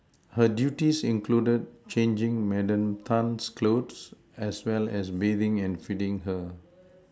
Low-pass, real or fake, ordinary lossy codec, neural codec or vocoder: none; real; none; none